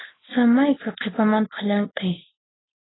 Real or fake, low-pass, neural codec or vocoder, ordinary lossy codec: real; 7.2 kHz; none; AAC, 16 kbps